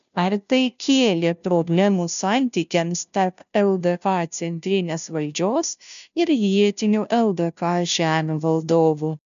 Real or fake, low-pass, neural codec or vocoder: fake; 7.2 kHz; codec, 16 kHz, 0.5 kbps, FunCodec, trained on Chinese and English, 25 frames a second